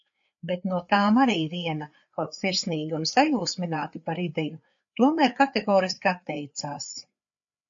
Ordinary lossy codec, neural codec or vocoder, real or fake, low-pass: AAC, 64 kbps; codec, 16 kHz, 4 kbps, FreqCodec, larger model; fake; 7.2 kHz